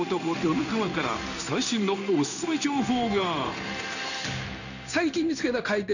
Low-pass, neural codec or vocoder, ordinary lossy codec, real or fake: 7.2 kHz; codec, 16 kHz in and 24 kHz out, 1 kbps, XY-Tokenizer; none; fake